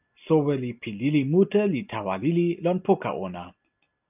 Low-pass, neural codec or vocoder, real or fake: 3.6 kHz; none; real